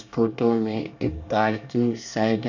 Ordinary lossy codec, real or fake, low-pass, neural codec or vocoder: none; fake; 7.2 kHz; codec, 24 kHz, 1 kbps, SNAC